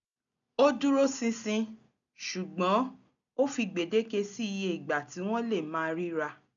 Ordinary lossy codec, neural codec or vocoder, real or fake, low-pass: none; none; real; 7.2 kHz